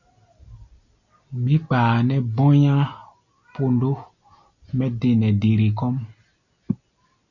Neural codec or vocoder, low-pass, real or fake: none; 7.2 kHz; real